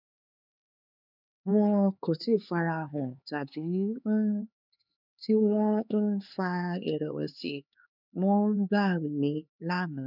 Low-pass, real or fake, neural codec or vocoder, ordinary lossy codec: 5.4 kHz; fake; codec, 16 kHz, 4 kbps, X-Codec, HuBERT features, trained on LibriSpeech; none